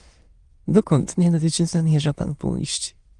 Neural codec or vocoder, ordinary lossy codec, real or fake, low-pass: autoencoder, 22.05 kHz, a latent of 192 numbers a frame, VITS, trained on many speakers; Opus, 24 kbps; fake; 9.9 kHz